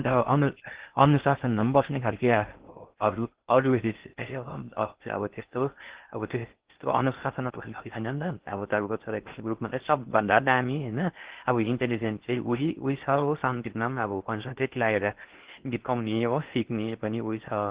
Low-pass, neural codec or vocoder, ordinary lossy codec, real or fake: 3.6 kHz; codec, 16 kHz in and 24 kHz out, 0.6 kbps, FocalCodec, streaming, 4096 codes; Opus, 16 kbps; fake